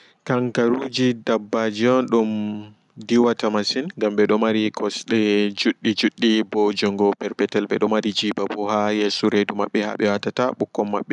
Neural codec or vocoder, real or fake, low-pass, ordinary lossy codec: none; real; 10.8 kHz; none